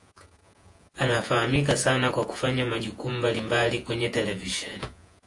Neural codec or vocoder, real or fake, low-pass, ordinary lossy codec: vocoder, 48 kHz, 128 mel bands, Vocos; fake; 10.8 kHz; AAC, 48 kbps